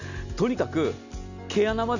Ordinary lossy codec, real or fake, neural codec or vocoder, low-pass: none; real; none; 7.2 kHz